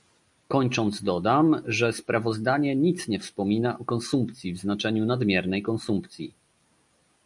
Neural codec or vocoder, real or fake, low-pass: none; real; 10.8 kHz